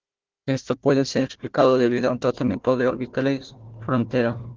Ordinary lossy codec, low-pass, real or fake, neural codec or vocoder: Opus, 16 kbps; 7.2 kHz; fake; codec, 16 kHz, 1 kbps, FunCodec, trained on Chinese and English, 50 frames a second